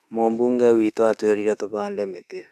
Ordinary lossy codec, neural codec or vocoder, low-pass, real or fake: none; autoencoder, 48 kHz, 32 numbers a frame, DAC-VAE, trained on Japanese speech; 14.4 kHz; fake